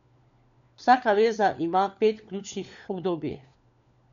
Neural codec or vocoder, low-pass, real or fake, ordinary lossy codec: codec, 16 kHz, 4 kbps, FunCodec, trained on LibriTTS, 50 frames a second; 7.2 kHz; fake; none